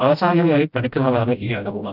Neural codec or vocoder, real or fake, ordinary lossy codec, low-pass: codec, 16 kHz, 0.5 kbps, FreqCodec, smaller model; fake; none; 5.4 kHz